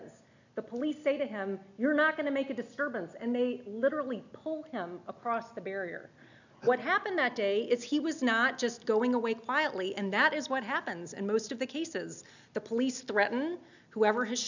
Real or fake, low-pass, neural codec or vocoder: real; 7.2 kHz; none